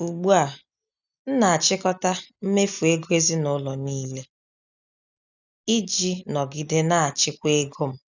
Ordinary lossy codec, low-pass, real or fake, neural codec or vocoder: none; 7.2 kHz; real; none